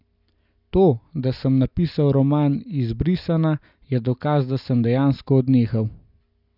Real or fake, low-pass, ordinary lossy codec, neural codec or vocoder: real; 5.4 kHz; none; none